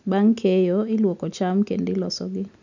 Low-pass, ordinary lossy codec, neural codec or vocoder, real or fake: 7.2 kHz; none; none; real